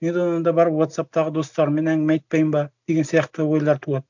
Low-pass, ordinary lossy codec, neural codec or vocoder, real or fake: 7.2 kHz; none; none; real